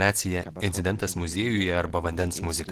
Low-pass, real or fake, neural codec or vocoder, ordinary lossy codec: 14.4 kHz; real; none; Opus, 16 kbps